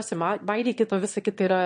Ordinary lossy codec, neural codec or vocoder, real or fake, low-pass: MP3, 48 kbps; autoencoder, 22.05 kHz, a latent of 192 numbers a frame, VITS, trained on one speaker; fake; 9.9 kHz